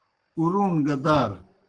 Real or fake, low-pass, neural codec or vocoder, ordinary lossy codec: fake; 9.9 kHz; codec, 44.1 kHz, 7.8 kbps, Pupu-Codec; Opus, 16 kbps